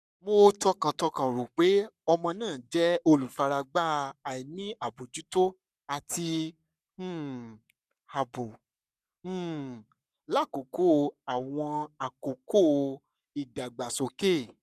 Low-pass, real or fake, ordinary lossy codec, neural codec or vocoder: 14.4 kHz; fake; none; codec, 44.1 kHz, 7.8 kbps, Pupu-Codec